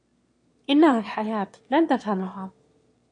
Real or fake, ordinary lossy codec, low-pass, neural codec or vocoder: fake; MP3, 48 kbps; 9.9 kHz; autoencoder, 22.05 kHz, a latent of 192 numbers a frame, VITS, trained on one speaker